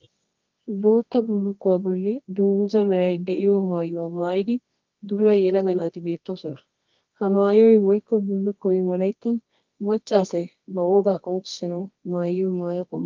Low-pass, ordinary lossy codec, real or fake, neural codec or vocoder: 7.2 kHz; Opus, 32 kbps; fake; codec, 24 kHz, 0.9 kbps, WavTokenizer, medium music audio release